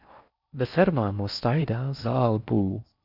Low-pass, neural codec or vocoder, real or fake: 5.4 kHz; codec, 16 kHz in and 24 kHz out, 0.6 kbps, FocalCodec, streaming, 4096 codes; fake